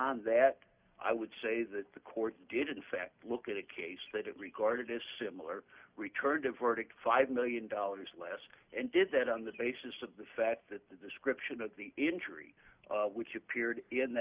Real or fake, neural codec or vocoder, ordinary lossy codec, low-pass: real; none; Opus, 64 kbps; 3.6 kHz